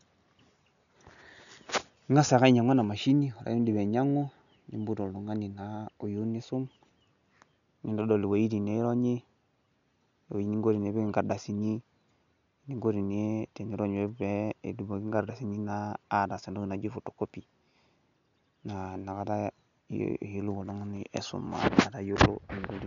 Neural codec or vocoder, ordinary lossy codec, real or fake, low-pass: none; none; real; 7.2 kHz